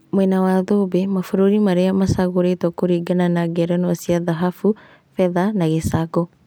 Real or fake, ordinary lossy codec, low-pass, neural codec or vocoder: real; none; none; none